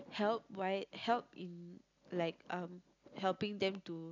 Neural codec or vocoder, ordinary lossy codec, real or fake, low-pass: none; none; real; 7.2 kHz